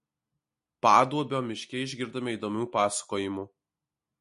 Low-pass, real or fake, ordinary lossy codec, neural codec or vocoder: 14.4 kHz; real; MP3, 48 kbps; none